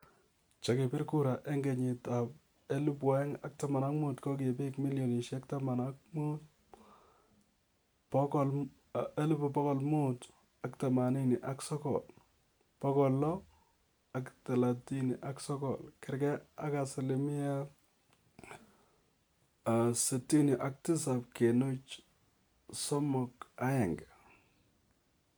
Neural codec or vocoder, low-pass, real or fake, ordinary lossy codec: none; none; real; none